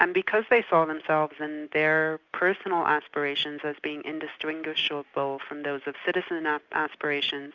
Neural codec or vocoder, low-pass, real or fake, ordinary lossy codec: none; 7.2 kHz; real; Opus, 64 kbps